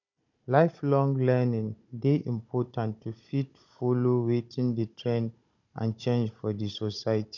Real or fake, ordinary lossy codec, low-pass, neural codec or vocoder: fake; none; 7.2 kHz; codec, 16 kHz, 16 kbps, FunCodec, trained on Chinese and English, 50 frames a second